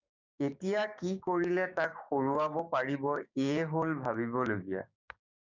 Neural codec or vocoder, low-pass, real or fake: codec, 16 kHz, 6 kbps, DAC; 7.2 kHz; fake